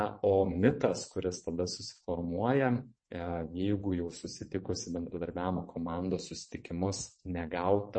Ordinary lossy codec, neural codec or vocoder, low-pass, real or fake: MP3, 32 kbps; vocoder, 22.05 kHz, 80 mel bands, WaveNeXt; 9.9 kHz; fake